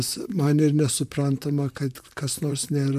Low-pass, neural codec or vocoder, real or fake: 14.4 kHz; vocoder, 44.1 kHz, 128 mel bands, Pupu-Vocoder; fake